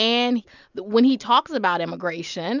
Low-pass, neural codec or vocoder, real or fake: 7.2 kHz; none; real